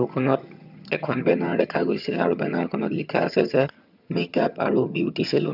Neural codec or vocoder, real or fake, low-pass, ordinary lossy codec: vocoder, 22.05 kHz, 80 mel bands, HiFi-GAN; fake; 5.4 kHz; none